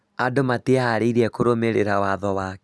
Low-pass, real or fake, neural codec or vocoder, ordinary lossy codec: none; real; none; none